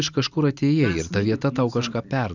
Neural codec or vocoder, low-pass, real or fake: none; 7.2 kHz; real